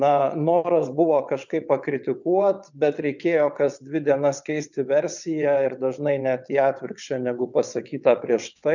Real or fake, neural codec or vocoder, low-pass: fake; vocoder, 22.05 kHz, 80 mel bands, WaveNeXt; 7.2 kHz